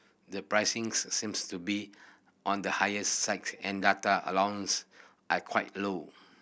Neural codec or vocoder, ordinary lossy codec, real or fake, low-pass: none; none; real; none